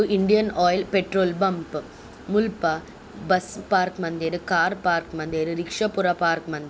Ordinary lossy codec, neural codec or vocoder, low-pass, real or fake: none; none; none; real